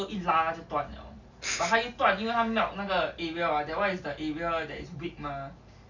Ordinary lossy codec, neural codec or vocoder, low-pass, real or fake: none; none; 7.2 kHz; real